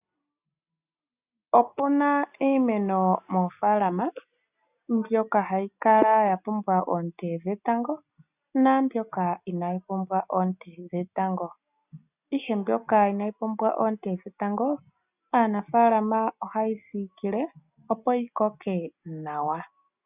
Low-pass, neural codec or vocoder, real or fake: 3.6 kHz; none; real